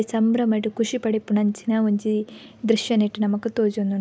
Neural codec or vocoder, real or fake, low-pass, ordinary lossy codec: none; real; none; none